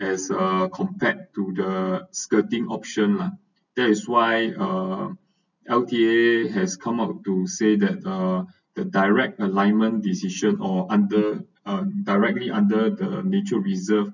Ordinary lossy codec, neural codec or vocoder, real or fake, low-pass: none; none; real; 7.2 kHz